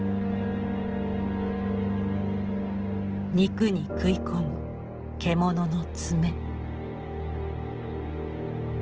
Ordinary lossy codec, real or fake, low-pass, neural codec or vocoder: Opus, 16 kbps; real; 7.2 kHz; none